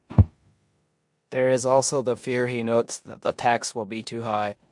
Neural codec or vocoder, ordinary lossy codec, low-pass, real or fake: codec, 16 kHz in and 24 kHz out, 0.9 kbps, LongCat-Audio-Codec, four codebook decoder; MP3, 64 kbps; 10.8 kHz; fake